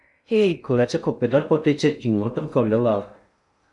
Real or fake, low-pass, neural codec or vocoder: fake; 10.8 kHz; codec, 16 kHz in and 24 kHz out, 0.6 kbps, FocalCodec, streaming, 2048 codes